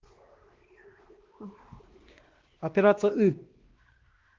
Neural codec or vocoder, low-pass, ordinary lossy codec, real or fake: codec, 16 kHz, 2 kbps, X-Codec, WavLM features, trained on Multilingual LibriSpeech; 7.2 kHz; Opus, 16 kbps; fake